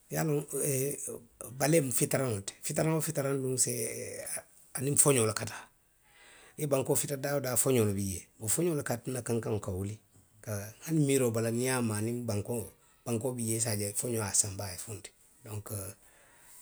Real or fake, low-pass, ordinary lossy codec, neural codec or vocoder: real; none; none; none